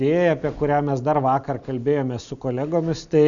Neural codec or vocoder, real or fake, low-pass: none; real; 7.2 kHz